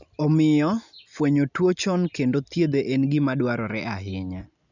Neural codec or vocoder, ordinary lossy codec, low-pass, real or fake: none; none; 7.2 kHz; real